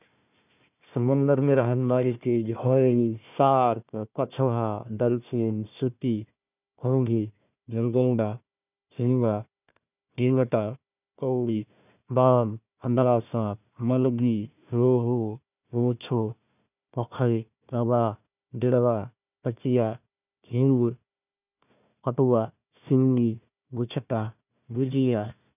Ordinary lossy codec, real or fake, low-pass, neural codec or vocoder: none; fake; 3.6 kHz; codec, 16 kHz, 1 kbps, FunCodec, trained on Chinese and English, 50 frames a second